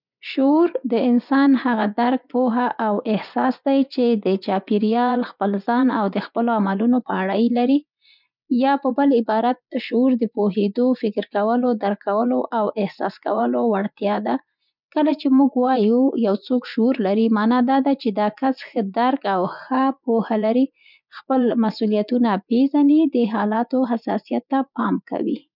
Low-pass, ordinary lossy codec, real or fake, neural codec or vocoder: 5.4 kHz; none; fake; vocoder, 24 kHz, 100 mel bands, Vocos